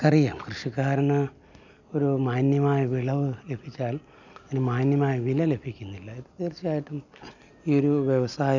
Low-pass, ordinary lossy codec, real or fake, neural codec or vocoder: 7.2 kHz; none; real; none